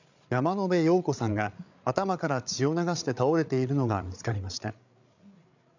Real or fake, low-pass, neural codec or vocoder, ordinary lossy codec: fake; 7.2 kHz; codec, 16 kHz, 8 kbps, FreqCodec, larger model; none